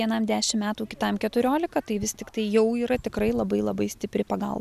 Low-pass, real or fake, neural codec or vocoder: 14.4 kHz; real; none